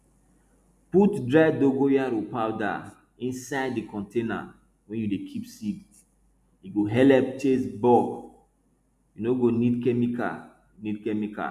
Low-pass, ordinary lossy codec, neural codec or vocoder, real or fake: 14.4 kHz; none; none; real